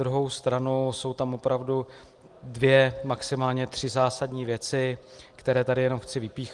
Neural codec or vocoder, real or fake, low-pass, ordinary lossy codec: none; real; 10.8 kHz; Opus, 24 kbps